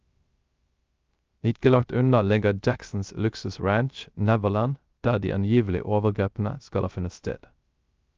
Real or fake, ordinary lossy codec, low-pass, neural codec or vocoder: fake; Opus, 24 kbps; 7.2 kHz; codec, 16 kHz, 0.3 kbps, FocalCodec